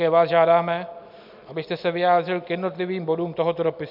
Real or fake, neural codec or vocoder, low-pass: real; none; 5.4 kHz